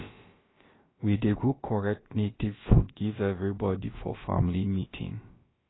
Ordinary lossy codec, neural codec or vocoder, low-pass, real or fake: AAC, 16 kbps; codec, 16 kHz, about 1 kbps, DyCAST, with the encoder's durations; 7.2 kHz; fake